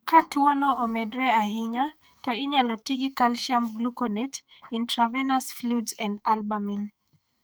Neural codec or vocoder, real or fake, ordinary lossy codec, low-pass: codec, 44.1 kHz, 2.6 kbps, SNAC; fake; none; none